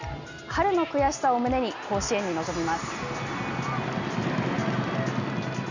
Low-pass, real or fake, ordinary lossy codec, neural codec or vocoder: 7.2 kHz; real; none; none